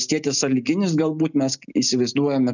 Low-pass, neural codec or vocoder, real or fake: 7.2 kHz; none; real